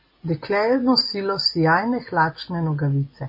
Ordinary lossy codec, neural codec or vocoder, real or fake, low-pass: MP3, 24 kbps; none; real; 5.4 kHz